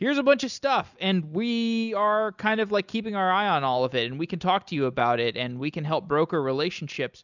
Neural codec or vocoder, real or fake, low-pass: none; real; 7.2 kHz